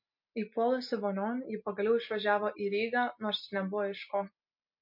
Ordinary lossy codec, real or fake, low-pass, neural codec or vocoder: MP3, 32 kbps; real; 5.4 kHz; none